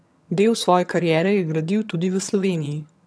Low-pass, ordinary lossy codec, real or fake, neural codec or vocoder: none; none; fake; vocoder, 22.05 kHz, 80 mel bands, HiFi-GAN